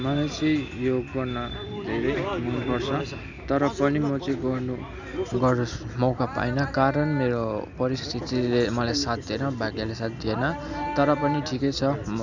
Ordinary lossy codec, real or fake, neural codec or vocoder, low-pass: none; real; none; 7.2 kHz